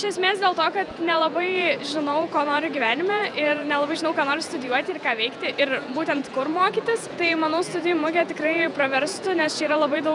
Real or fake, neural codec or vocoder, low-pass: fake; vocoder, 48 kHz, 128 mel bands, Vocos; 10.8 kHz